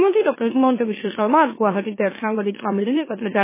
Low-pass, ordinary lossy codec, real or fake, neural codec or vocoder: 3.6 kHz; MP3, 16 kbps; fake; autoencoder, 44.1 kHz, a latent of 192 numbers a frame, MeloTTS